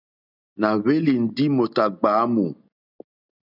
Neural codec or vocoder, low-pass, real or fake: none; 5.4 kHz; real